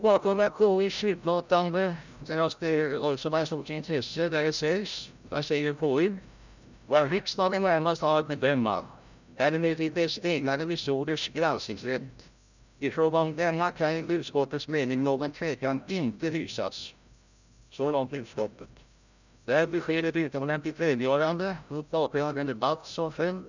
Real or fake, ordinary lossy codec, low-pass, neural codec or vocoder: fake; none; 7.2 kHz; codec, 16 kHz, 0.5 kbps, FreqCodec, larger model